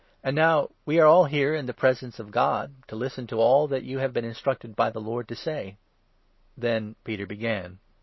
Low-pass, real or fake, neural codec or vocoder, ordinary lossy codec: 7.2 kHz; real; none; MP3, 24 kbps